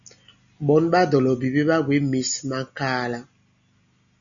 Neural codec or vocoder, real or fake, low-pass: none; real; 7.2 kHz